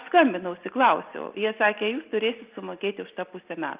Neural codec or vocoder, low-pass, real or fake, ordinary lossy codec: none; 3.6 kHz; real; Opus, 24 kbps